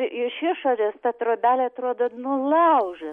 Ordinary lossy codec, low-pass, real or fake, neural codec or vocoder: MP3, 48 kbps; 10.8 kHz; real; none